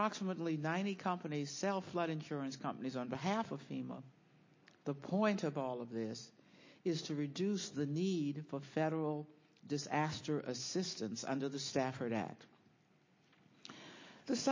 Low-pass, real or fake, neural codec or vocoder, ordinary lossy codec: 7.2 kHz; real; none; MP3, 32 kbps